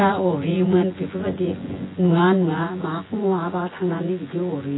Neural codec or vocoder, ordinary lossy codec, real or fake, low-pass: vocoder, 24 kHz, 100 mel bands, Vocos; AAC, 16 kbps; fake; 7.2 kHz